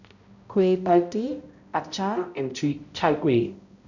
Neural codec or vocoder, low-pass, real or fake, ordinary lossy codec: codec, 16 kHz, 0.5 kbps, X-Codec, HuBERT features, trained on balanced general audio; 7.2 kHz; fake; none